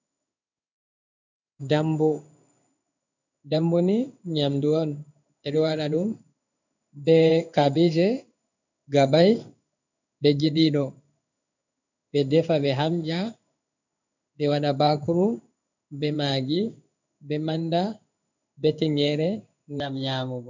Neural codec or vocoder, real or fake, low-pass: codec, 16 kHz in and 24 kHz out, 1 kbps, XY-Tokenizer; fake; 7.2 kHz